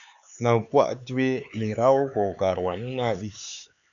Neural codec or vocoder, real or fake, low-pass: codec, 16 kHz, 4 kbps, X-Codec, HuBERT features, trained on LibriSpeech; fake; 7.2 kHz